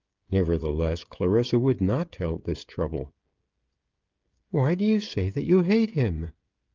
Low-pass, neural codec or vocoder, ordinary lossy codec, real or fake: 7.2 kHz; codec, 16 kHz, 16 kbps, FreqCodec, smaller model; Opus, 32 kbps; fake